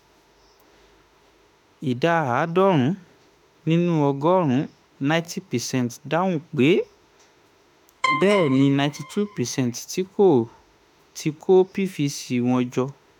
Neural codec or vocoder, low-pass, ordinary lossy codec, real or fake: autoencoder, 48 kHz, 32 numbers a frame, DAC-VAE, trained on Japanese speech; 19.8 kHz; none; fake